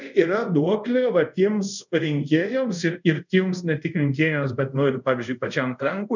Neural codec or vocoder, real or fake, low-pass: codec, 24 kHz, 0.5 kbps, DualCodec; fake; 7.2 kHz